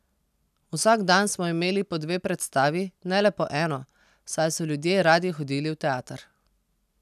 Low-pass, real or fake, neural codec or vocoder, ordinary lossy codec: 14.4 kHz; real; none; none